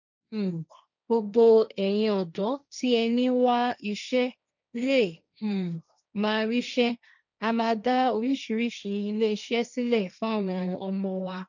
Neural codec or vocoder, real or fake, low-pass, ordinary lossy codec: codec, 16 kHz, 1.1 kbps, Voila-Tokenizer; fake; 7.2 kHz; none